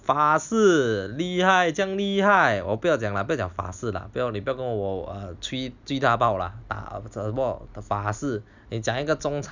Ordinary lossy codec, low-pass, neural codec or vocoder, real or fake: none; 7.2 kHz; none; real